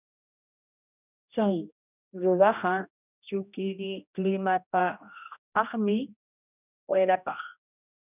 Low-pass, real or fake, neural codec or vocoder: 3.6 kHz; fake; codec, 16 kHz, 1 kbps, X-Codec, HuBERT features, trained on general audio